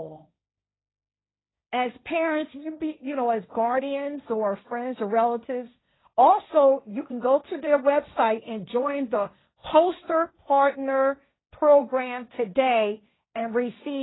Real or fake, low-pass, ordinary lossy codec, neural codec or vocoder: fake; 7.2 kHz; AAC, 16 kbps; codec, 16 kHz, 1.1 kbps, Voila-Tokenizer